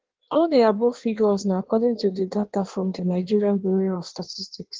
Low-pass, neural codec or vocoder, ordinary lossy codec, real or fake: 7.2 kHz; codec, 16 kHz in and 24 kHz out, 1.1 kbps, FireRedTTS-2 codec; Opus, 16 kbps; fake